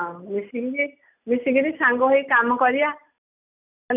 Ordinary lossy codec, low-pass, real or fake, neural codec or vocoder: none; 3.6 kHz; real; none